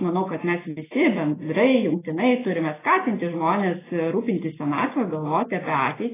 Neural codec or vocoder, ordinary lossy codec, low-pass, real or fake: none; AAC, 16 kbps; 3.6 kHz; real